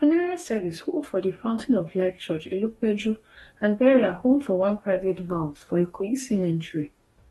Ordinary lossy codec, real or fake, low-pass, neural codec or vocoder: AAC, 32 kbps; fake; 19.8 kHz; codec, 44.1 kHz, 2.6 kbps, DAC